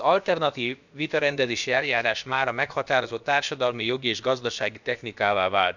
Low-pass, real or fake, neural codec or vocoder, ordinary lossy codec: 7.2 kHz; fake; codec, 16 kHz, about 1 kbps, DyCAST, with the encoder's durations; none